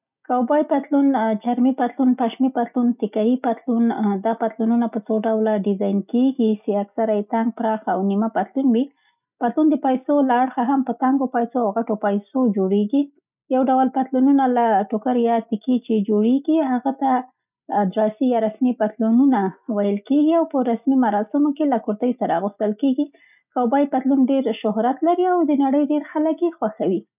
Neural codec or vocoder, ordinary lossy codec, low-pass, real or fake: none; none; 3.6 kHz; real